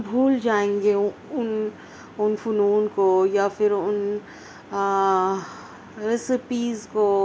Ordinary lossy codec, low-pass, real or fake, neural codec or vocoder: none; none; real; none